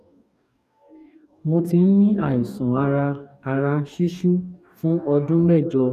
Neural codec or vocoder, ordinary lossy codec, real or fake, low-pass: codec, 44.1 kHz, 2.6 kbps, DAC; none; fake; 14.4 kHz